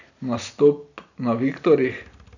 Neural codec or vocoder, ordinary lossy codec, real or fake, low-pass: none; none; real; 7.2 kHz